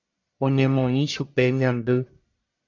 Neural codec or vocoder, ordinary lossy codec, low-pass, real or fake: codec, 44.1 kHz, 1.7 kbps, Pupu-Codec; AAC, 48 kbps; 7.2 kHz; fake